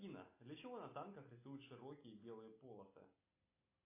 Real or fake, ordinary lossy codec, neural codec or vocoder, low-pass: real; AAC, 32 kbps; none; 3.6 kHz